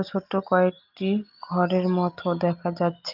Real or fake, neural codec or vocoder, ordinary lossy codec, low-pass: real; none; Opus, 24 kbps; 5.4 kHz